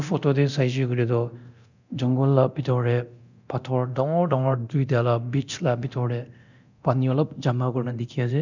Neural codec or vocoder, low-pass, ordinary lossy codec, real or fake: codec, 24 kHz, 0.9 kbps, DualCodec; 7.2 kHz; none; fake